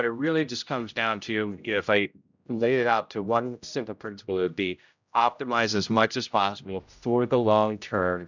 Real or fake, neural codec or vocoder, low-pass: fake; codec, 16 kHz, 0.5 kbps, X-Codec, HuBERT features, trained on general audio; 7.2 kHz